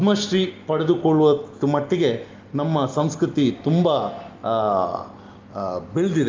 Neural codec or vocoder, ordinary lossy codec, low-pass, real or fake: none; Opus, 32 kbps; 7.2 kHz; real